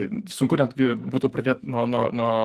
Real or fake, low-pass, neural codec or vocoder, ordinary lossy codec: fake; 14.4 kHz; codec, 44.1 kHz, 2.6 kbps, SNAC; Opus, 24 kbps